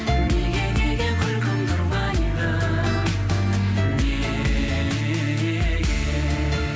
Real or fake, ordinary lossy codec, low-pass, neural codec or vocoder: real; none; none; none